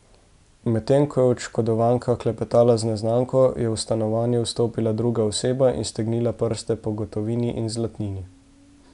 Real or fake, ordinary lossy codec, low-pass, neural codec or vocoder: real; none; 10.8 kHz; none